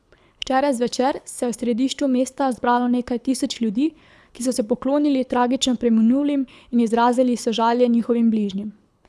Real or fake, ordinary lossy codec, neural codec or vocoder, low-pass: fake; none; codec, 24 kHz, 6 kbps, HILCodec; none